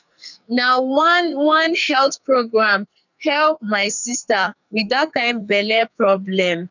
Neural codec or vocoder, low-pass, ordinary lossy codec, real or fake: codec, 44.1 kHz, 2.6 kbps, SNAC; 7.2 kHz; none; fake